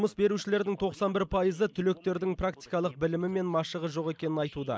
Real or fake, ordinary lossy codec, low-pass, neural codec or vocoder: real; none; none; none